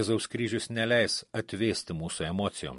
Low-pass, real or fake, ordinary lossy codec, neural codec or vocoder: 14.4 kHz; real; MP3, 48 kbps; none